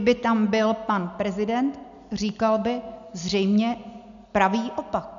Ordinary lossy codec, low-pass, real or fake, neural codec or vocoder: MP3, 96 kbps; 7.2 kHz; real; none